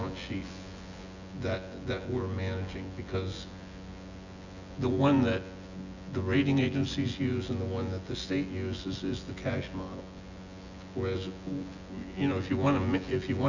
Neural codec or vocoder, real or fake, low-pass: vocoder, 24 kHz, 100 mel bands, Vocos; fake; 7.2 kHz